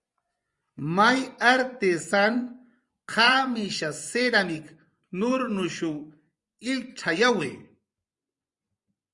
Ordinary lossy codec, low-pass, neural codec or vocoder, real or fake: Opus, 64 kbps; 10.8 kHz; vocoder, 24 kHz, 100 mel bands, Vocos; fake